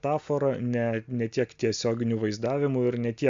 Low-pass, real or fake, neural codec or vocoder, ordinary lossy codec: 7.2 kHz; real; none; MP3, 64 kbps